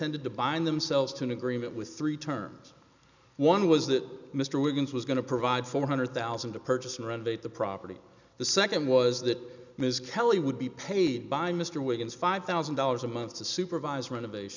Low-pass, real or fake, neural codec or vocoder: 7.2 kHz; real; none